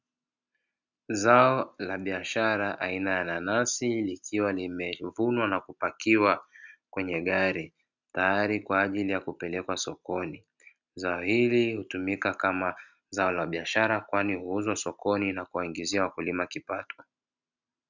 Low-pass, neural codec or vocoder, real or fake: 7.2 kHz; none; real